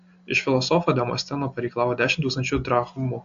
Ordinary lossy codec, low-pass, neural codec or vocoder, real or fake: AAC, 64 kbps; 7.2 kHz; none; real